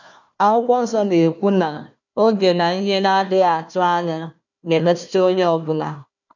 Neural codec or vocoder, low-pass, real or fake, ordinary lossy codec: codec, 16 kHz, 1 kbps, FunCodec, trained on Chinese and English, 50 frames a second; 7.2 kHz; fake; none